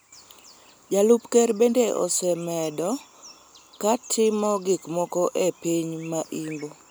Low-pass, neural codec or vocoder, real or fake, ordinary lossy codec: none; none; real; none